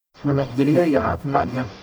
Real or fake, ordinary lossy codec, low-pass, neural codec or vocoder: fake; none; none; codec, 44.1 kHz, 0.9 kbps, DAC